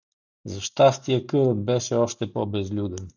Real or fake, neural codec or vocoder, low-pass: real; none; 7.2 kHz